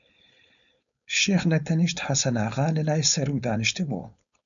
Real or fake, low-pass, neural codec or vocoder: fake; 7.2 kHz; codec, 16 kHz, 4.8 kbps, FACodec